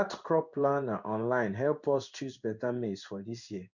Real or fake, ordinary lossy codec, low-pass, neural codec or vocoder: fake; none; 7.2 kHz; codec, 16 kHz in and 24 kHz out, 1 kbps, XY-Tokenizer